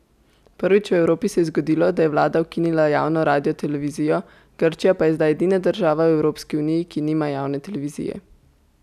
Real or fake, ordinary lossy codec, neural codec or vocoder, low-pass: real; none; none; 14.4 kHz